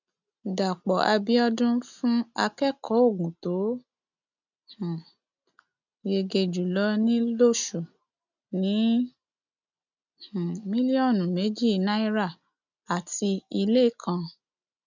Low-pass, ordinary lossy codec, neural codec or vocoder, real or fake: 7.2 kHz; none; none; real